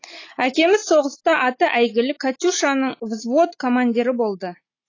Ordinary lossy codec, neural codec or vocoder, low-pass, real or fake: AAC, 32 kbps; none; 7.2 kHz; real